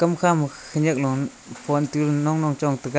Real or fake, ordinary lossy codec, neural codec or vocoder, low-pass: real; none; none; none